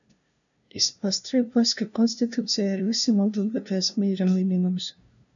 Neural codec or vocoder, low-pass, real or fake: codec, 16 kHz, 0.5 kbps, FunCodec, trained on LibriTTS, 25 frames a second; 7.2 kHz; fake